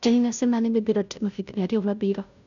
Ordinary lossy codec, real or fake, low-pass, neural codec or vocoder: none; fake; 7.2 kHz; codec, 16 kHz, 0.5 kbps, FunCodec, trained on Chinese and English, 25 frames a second